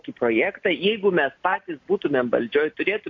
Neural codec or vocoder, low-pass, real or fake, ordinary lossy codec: none; 7.2 kHz; real; AAC, 48 kbps